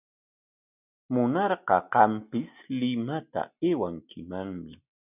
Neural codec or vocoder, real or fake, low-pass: none; real; 3.6 kHz